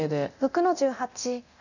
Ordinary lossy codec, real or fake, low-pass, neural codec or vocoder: none; fake; 7.2 kHz; codec, 24 kHz, 0.9 kbps, DualCodec